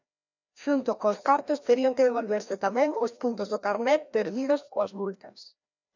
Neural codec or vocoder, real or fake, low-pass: codec, 16 kHz, 1 kbps, FreqCodec, larger model; fake; 7.2 kHz